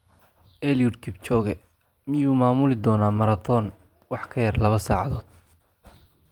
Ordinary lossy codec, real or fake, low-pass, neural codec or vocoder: Opus, 32 kbps; fake; 19.8 kHz; vocoder, 44.1 kHz, 128 mel bands every 512 samples, BigVGAN v2